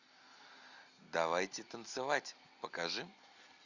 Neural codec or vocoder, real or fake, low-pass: none; real; 7.2 kHz